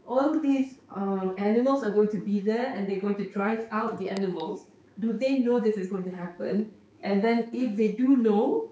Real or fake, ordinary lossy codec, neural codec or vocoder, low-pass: fake; none; codec, 16 kHz, 4 kbps, X-Codec, HuBERT features, trained on balanced general audio; none